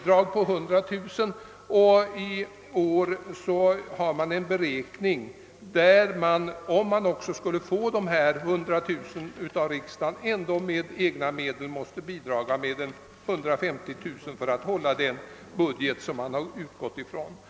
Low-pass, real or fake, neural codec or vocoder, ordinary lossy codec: none; real; none; none